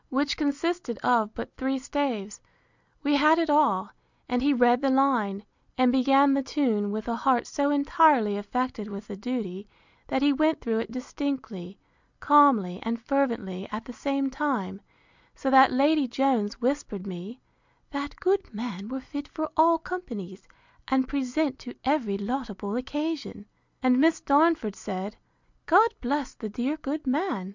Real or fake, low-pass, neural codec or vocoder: real; 7.2 kHz; none